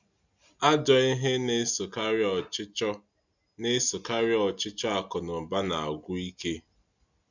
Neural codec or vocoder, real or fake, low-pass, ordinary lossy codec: none; real; 7.2 kHz; none